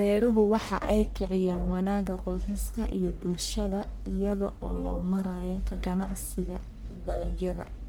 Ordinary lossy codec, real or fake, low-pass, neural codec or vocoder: none; fake; none; codec, 44.1 kHz, 1.7 kbps, Pupu-Codec